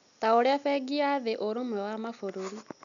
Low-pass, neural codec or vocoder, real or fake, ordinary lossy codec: 7.2 kHz; none; real; none